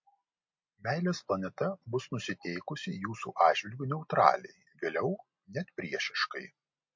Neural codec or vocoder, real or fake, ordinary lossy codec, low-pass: none; real; MP3, 48 kbps; 7.2 kHz